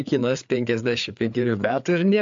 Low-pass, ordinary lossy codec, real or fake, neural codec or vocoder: 7.2 kHz; AAC, 64 kbps; fake; codec, 16 kHz, 4 kbps, FreqCodec, larger model